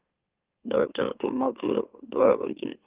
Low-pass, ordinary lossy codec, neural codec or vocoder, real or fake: 3.6 kHz; Opus, 32 kbps; autoencoder, 44.1 kHz, a latent of 192 numbers a frame, MeloTTS; fake